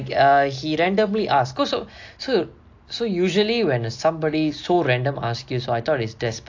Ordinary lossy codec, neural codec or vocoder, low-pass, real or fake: AAC, 48 kbps; none; 7.2 kHz; real